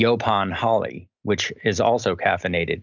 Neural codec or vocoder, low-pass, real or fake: none; 7.2 kHz; real